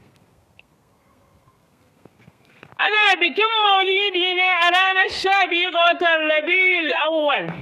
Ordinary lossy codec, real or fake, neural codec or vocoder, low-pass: none; fake; codec, 32 kHz, 1.9 kbps, SNAC; 14.4 kHz